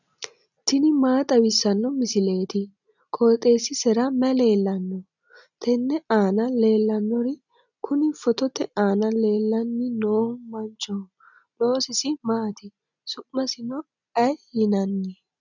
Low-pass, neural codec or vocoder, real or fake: 7.2 kHz; none; real